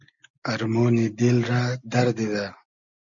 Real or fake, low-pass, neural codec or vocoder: real; 7.2 kHz; none